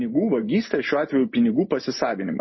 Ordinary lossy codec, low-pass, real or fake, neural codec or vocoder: MP3, 24 kbps; 7.2 kHz; real; none